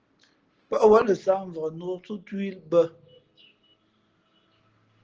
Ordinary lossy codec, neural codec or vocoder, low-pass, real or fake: Opus, 16 kbps; none; 7.2 kHz; real